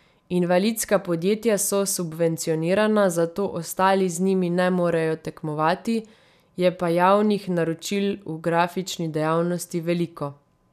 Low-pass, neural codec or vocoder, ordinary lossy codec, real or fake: 14.4 kHz; none; none; real